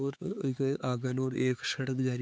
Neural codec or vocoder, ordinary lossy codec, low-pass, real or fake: codec, 16 kHz, 4 kbps, X-Codec, HuBERT features, trained on LibriSpeech; none; none; fake